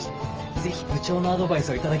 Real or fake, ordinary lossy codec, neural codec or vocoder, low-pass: fake; Opus, 24 kbps; autoencoder, 48 kHz, 128 numbers a frame, DAC-VAE, trained on Japanese speech; 7.2 kHz